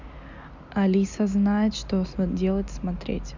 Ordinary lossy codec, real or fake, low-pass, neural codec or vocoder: none; real; 7.2 kHz; none